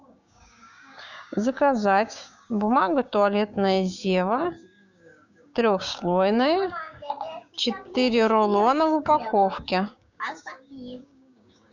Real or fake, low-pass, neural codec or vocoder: fake; 7.2 kHz; codec, 16 kHz, 6 kbps, DAC